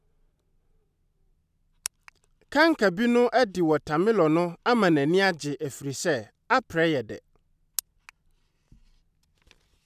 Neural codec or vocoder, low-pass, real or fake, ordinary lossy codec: none; 14.4 kHz; real; none